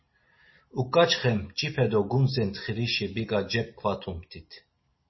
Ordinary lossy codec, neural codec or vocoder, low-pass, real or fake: MP3, 24 kbps; none; 7.2 kHz; real